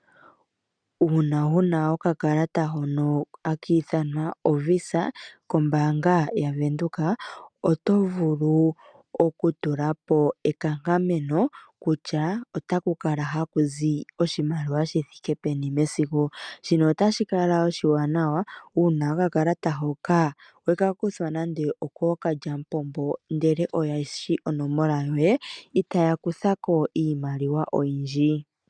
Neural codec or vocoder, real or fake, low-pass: none; real; 9.9 kHz